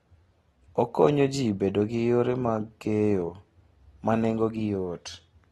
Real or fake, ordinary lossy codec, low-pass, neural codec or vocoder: real; AAC, 32 kbps; 19.8 kHz; none